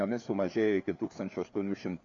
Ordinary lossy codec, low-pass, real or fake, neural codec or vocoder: MP3, 64 kbps; 7.2 kHz; fake; codec, 16 kHz, 4 kbps, FreqCodec, larger model